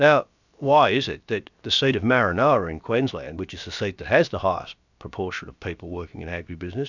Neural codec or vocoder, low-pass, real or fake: codec, 16 kHz, about 1 kbps, DyCAST, with the encoder's durations; 7.2 kHz; fake